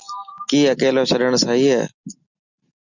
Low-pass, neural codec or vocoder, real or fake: 7.2 kHz; none; real